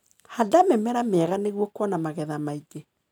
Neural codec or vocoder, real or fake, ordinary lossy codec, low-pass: none; real; none; none